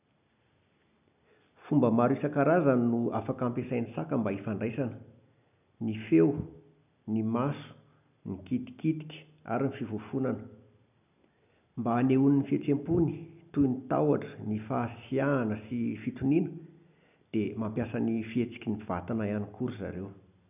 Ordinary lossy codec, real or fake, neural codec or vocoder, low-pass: none; real; none; 3.6 kHz